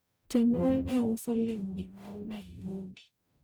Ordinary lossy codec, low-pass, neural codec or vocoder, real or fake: none; none; codec, 44.1 kHz, 0.9 kbps, DAC; fake